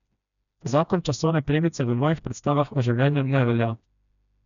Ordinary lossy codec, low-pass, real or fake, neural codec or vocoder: none; 7.2 kHz; fake; codec, 16 kHz, 1 kbps, FreqCodec, smaller model